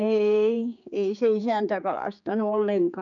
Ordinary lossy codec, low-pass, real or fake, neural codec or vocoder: none; 7.2 kHz; fake; codec, 16 kHz, 4 kbps, X-Codec, HuBERT features, trained on general audio